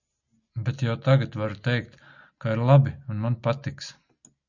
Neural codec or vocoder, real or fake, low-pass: none; real; 7.2 kHz